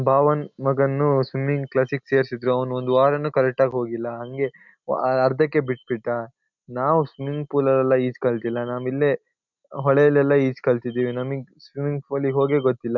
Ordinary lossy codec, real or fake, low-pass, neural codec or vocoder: none; real; 7.2 kHz; none